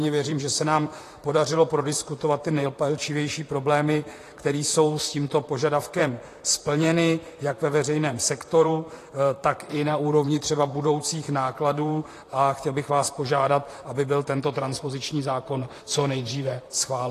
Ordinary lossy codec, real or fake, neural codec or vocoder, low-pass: AAC, 48 kbps; fake; vocoder, 44.1 kHz, 128 mel bands, Pupu-Vocoder; 14.4 kHz